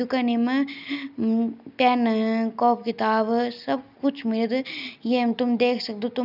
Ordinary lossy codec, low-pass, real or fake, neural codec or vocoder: none; 5.4 kHz; real; none